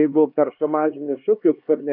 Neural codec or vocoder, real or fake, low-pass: codec, 16 kHz, 2 kbps, X-Codec, HuBERT features, trained on LibriSpeech; fake; 5.4 kHz